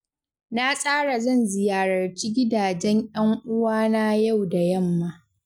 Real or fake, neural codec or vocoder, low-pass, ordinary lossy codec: real; none; 19.8 kHz; none